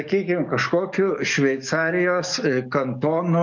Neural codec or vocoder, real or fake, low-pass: codec, 44.1 kHz, 7.8 kbps, DAC; fake; 7.2 kHz